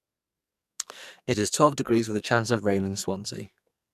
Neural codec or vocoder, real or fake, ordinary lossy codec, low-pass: codec, 44.1 kHz, 2.6 kbps, SNAC; fake; none; 14.4 kHz